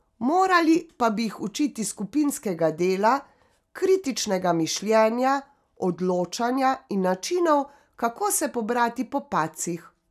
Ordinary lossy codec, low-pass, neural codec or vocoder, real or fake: none; 14.4 kHz; none; real